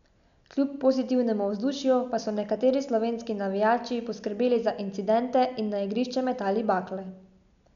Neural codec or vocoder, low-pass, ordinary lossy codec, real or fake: none; 7.2 kHz; none; real